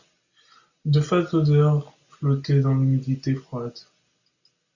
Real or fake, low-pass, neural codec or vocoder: real; 7.2 kHz; none